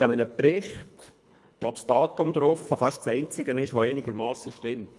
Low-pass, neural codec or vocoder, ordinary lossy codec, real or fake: none; codec, 24 kHz, 1.5 kbps, HILCodec; none; fake